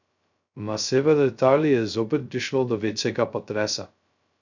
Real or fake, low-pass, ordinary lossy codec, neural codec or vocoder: fake; 7.2 kHz; none; codec, 16 kHz, 0.2 kbps, FocalCodec